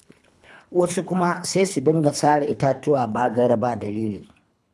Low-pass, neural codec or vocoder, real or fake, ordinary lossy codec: none; codec, 24 kHz, 3 kbps, HILCodec; fake; none